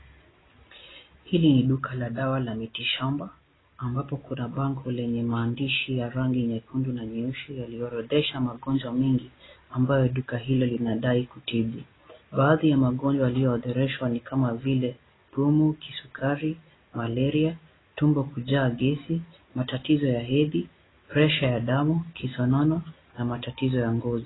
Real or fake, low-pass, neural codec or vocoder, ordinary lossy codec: real; 7.2 kHz; none; AAC, 16 kbps